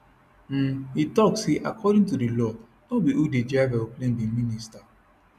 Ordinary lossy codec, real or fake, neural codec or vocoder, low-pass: none; real; none; 14.4 kHz